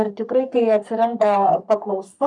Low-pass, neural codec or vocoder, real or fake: 10.8 kHz; codec, 44.1 kHz, 3.4 kbps, Pupu-Codec; fake